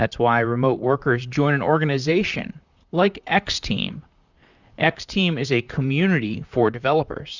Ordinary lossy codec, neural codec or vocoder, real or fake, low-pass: Opus, 64 kbps; vocoder, 44.1 kHz, 128 mel bands, Pupu-Vocoder; fake; 7.2 kHz